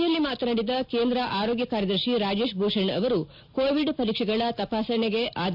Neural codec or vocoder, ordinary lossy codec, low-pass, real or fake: none; AAC, 48 kbps; 5.4 kHz; real